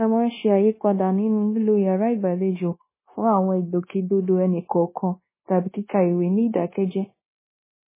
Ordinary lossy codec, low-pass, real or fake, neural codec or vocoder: MP3, 16 kbps; 3.6 kHz; fake; codec, 16 kHz, 0.9 kbps, LongCat-Audio-Codec